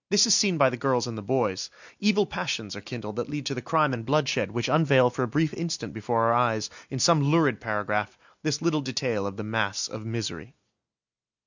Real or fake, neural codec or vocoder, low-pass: real; none; 7.2 kHz